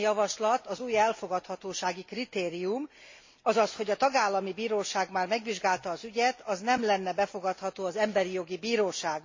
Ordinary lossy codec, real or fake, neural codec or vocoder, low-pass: MP3, 32 kbps; real; none; 7.2 kHz